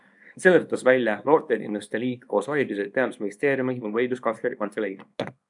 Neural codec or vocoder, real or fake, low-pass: codec, 24 kHz, 0.9 kbps, WavTokenizer, small release; fake; 10.8 kHz